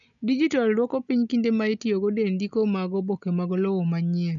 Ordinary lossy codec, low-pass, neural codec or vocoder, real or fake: none; 7.2 kHz; none; real